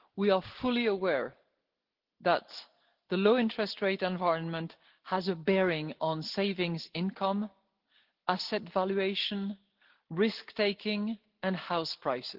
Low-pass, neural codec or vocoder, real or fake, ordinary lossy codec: 5.4 kHz; none; real; Opus, 16 kbps